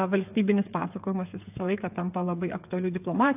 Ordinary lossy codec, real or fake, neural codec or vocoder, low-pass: AAC, 32 kbps; fake; codec, 16 kHz, 8 kbps, FreqCodec, smaller model; 3.6 kHz